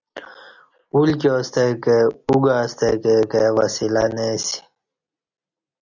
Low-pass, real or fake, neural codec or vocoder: 7.2 kHz; real; none